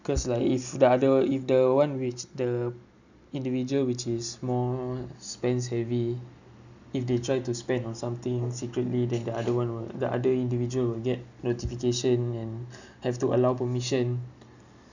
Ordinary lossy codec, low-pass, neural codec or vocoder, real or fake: none; 7.2 kHz; none; real